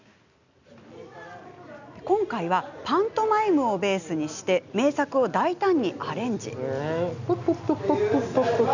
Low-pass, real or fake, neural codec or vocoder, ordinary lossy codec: 7.2 kHz; real; none; none